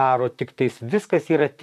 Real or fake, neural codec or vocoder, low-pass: fake; vocoder, 44.1 kHz, 128 mel bands, Pupu-Vocoder; 14.4 kHz